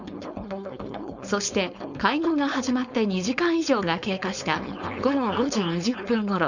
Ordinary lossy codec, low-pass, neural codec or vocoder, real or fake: none; 7.2 kHz; codec, 16 kHz, 4.8 kbps, FACodec; fake